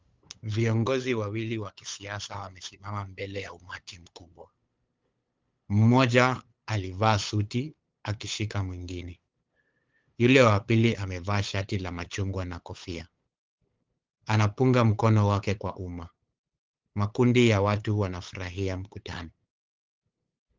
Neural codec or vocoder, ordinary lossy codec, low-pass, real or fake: codec, 16 kHz, 8 kbps, FunCodec, trained on LibriTTS, 25 frames a second; Opus, 32 kbps; 7.2 kHz; fake